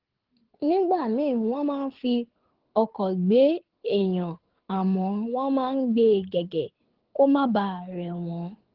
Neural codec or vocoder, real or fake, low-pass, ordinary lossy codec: codec, 24 kHz, 6 kbps, HILCodec; fake; 5.4 kHz; Opus, 16 kbps